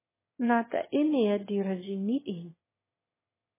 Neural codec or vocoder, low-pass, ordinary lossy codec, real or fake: autoencoder, 22.05 kHz, a latent of 192 numbers a frame, VITS, trained on one speaker; 3.6 kHz; MP3, 16 kbps; fake